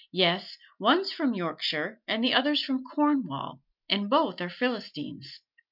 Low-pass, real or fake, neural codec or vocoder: 5.4 kHz; real; none